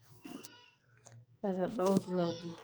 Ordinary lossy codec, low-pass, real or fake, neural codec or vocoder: none; none; fake; codec, 44.1 kHz, 2.6 kbps, SNAC